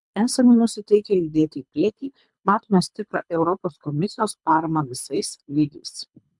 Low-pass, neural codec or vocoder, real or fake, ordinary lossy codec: 10.8 kHz; codec, 24 kHz, 3 kbps, HILCodec; fake; MP3, 96 kbps